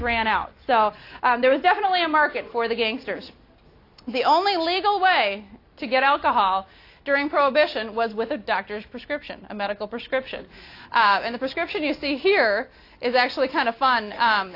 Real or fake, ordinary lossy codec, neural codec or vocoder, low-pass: real; AAC, 32 kbps; none; 5.4 kHz